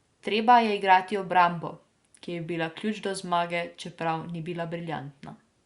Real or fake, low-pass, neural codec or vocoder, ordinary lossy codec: real; 10.8 kHz; none; Opus, 64 kbps